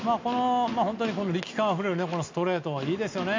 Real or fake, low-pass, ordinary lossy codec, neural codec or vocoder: real; 7.2 kHz; MP3, 64 kbps; none